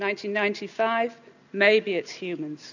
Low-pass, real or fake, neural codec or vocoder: 7.2 kHz; real; none